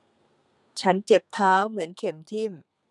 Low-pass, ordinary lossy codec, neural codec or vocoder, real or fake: 10.8 kHz; none; codec, 32 kHz, 1.9 kbps, SNAC; fake